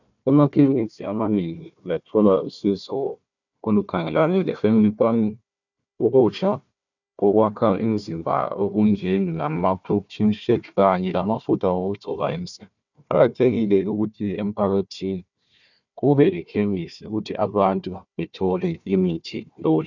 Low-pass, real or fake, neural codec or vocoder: 7.2 kHz; fake; codec, 16 kHz, 1 kbps, FunCodec, trained on Chinese and English, 50 frames a second